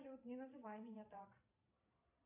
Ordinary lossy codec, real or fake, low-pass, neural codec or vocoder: MP3, 32 kbps; fake; 3.6 kHz; vocoder, 22.05 kHz, 80 mel bands, Vocos